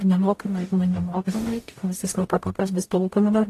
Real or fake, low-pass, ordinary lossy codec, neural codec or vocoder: fake; 14.4 kHz; AAC, 48 kbps; codec, 44.1 kHz, 0.9 kbps, DAC